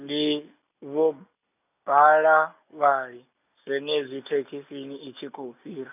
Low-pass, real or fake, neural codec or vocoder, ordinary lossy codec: 3.6 kHz; real; none; none